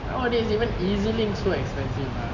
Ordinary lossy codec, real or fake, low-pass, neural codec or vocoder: none; real; 7.2 kHz; none